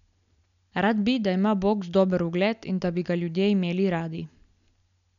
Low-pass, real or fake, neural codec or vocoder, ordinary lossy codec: 7.2 kHz; real; none; none